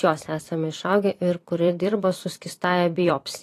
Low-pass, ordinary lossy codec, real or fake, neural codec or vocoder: 14.4 kHz; AAC, 48 kbps; fake; vocoder, 44.1 kHz, 128 mel bands every 256 samples, BigVGAN v2